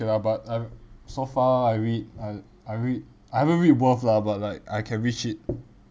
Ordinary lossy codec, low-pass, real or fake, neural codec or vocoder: none; none; real; none